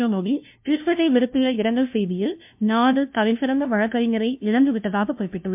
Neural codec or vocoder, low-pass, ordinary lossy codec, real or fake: codec, 16 kHz, 0.5 kbps, FunCodec, trained on LibriTTS, 25 frames a second; 3.6 kHz; MP3, 32 kbps; fake